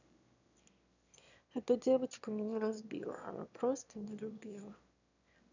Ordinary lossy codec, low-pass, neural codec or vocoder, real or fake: none; 7.2 kHz; autoencoder, 22.05 kHz, a latent of 192 numbers a frame, VITS, trained on one speaker; fake